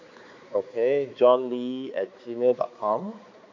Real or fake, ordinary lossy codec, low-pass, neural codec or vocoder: fake; MP3, 64 kbps; 7.2 kHz; codec, 16 kHz, 4 kbps, X-Codec, HuBERT features, trained on balanced general audio